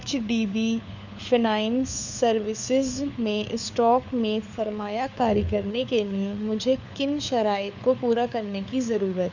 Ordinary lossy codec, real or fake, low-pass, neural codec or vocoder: none; fake; 7.2 kHz; codec, 16 kHz, 4 kbps, FunCodec, trained on LibriTTS, 50 frames a second